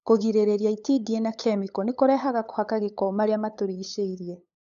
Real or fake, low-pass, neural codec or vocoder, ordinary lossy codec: fake; 7.2 kHz; codec, 16 kHz, 8 kbps, FunCodec, trained on LibriTTS, 25 frames a second; none